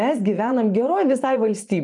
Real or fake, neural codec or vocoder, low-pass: fake; vocoder, 24 kHz, 100 mel bands, Vocos; 10.8 kHz